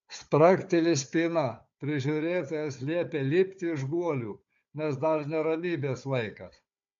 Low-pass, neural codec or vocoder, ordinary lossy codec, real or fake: 7.2 kHz; codec, 16 kHz, 4 kbps, FunCodec, trained on Chinese and English, 50 frames a second; MP3, 48 kbps; fake